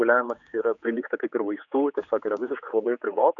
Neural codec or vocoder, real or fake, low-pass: codec, 16 kHz, 4 kbps, X-Codec, HuBERT features, trained on general audio; fake; 7.2 kHz